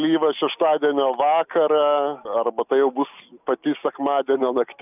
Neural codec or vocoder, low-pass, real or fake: none; 3.6 kHz; real